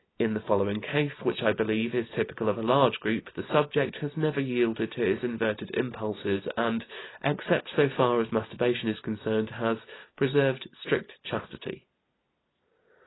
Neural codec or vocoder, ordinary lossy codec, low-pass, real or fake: none; AAC, 16 kbps; 7.2 kHz; real